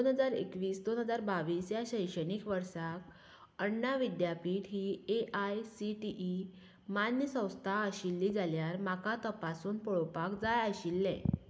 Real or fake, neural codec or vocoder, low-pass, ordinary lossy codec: real; none; none; none